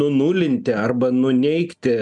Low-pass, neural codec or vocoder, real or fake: 10.8 kHz; none; real